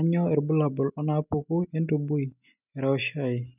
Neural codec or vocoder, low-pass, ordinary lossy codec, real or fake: none; 3.6 kHz; none; real